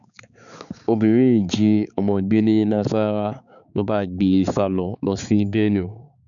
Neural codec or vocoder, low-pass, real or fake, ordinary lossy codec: codec, 16 kHz, 4 kbps, X-Codec, HuBERT features, trained on balanced general audio; 7.2 kHz; fake; none